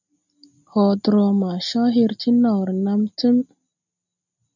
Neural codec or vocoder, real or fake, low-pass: none; real; 7.2 kHz